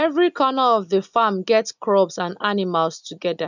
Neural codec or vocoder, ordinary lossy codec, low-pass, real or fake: none; none; 7.2 kHz; real